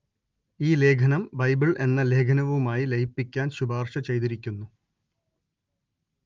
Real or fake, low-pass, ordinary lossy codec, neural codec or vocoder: real; 7.2 kHz; Opus, 32 kbps; none